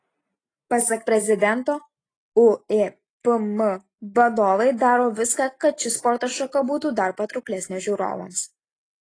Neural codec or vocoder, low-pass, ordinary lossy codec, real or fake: none; 9.9 kHz; AAC, 32 kbps; real